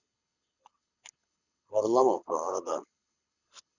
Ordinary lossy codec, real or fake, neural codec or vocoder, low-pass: none; fake; codec, 24 kHz, 6 kbps, HILCodec; 7.2 kHz